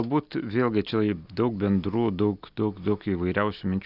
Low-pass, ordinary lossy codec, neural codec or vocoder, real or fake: 5.4 kHz; Opus, 64 kbps; none; real